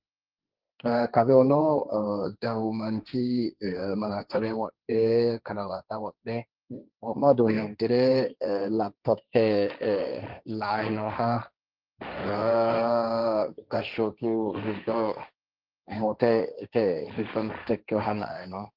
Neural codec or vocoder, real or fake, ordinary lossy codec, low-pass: codec, 16 kHz, 1.1 kbps, Voila-Tokenizer; fake; Opus, 32 kbps; 5.4 kHz